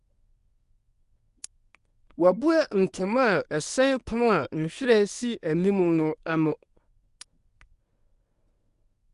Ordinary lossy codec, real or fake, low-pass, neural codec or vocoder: Opus, 64 kbps; fake; 10.8 kHz; codec, 24 kHz, 0.9 kbps, WavTokenizer, medium speech release version 1